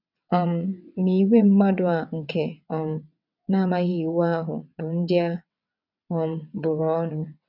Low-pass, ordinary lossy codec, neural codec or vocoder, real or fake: 5.4 kHz; none; vocoder, 22.05 kHz, 80 mel bands, WaveNeXt; fake